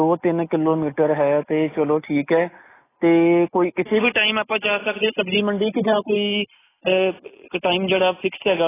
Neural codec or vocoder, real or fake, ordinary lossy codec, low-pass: none; real; AAC, 16 kbps; 3.6 kHz